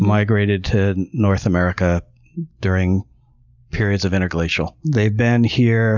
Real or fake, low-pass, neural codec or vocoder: real; 7.2 kHz; none